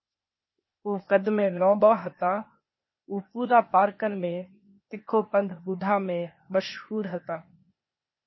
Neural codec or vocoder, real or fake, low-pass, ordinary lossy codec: codec, 16 kHz, 0.8 kbps, ZipCodec; fake; 7.2 kHz; MP3, 24 kbps